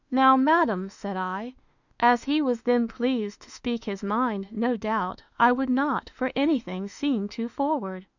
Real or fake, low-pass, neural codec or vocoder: fake; 7.2 kHz; autoencoder, 48 kHz, 32 numbers a frame, DAC-VAE, trained on Japanese speech